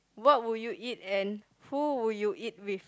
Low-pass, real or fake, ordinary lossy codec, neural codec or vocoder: none; real; none; none